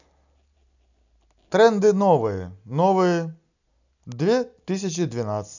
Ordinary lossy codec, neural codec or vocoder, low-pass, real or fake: none; none; 7.2 kHz; real